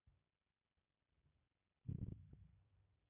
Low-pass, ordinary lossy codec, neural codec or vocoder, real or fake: 3.6 kHz; MP3, 32 kbps; none; real